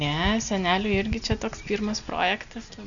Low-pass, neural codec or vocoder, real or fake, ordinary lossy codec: 7.2 kHz; none; real; MP3, 96 kbps